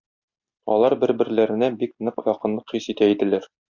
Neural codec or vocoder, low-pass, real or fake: none; 7.2 kHz; real